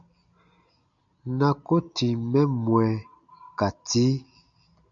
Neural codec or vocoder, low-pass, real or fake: none; 7.2 kHz; real